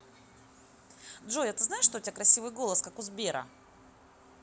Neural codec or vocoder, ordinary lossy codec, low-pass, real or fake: none; none; none; real